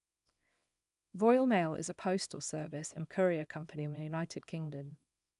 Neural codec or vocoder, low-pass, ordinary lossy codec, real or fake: codec, 24 kHz, 0.9 kbps, WavTokenizer, small release; 10.8 kHz; none; fake